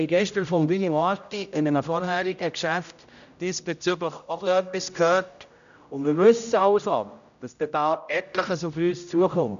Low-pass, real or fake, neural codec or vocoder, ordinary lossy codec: 7.2 kHz; fake; codec, 16 kHz, 0.5 kbps, X-Codec, HuBERT features, trained on general audio; none